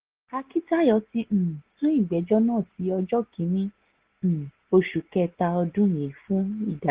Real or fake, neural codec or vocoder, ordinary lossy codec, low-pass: real; none; Opus, 16 kbps; 3.6 kHz